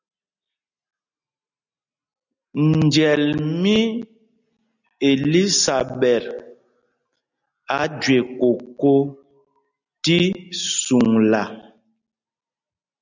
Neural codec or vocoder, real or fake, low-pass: none; real; 7.2 kHz